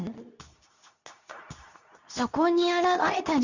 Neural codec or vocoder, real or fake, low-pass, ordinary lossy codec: codec, 24 kHz, 0.9 kbps, WavTokenizer, medium speech release version 2; fake; 7.2 kHz; none